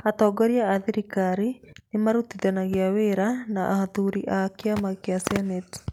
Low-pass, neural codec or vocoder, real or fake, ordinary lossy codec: 19.8 kHz; none; real; none